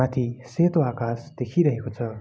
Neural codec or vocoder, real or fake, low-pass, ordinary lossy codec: none; real; none; none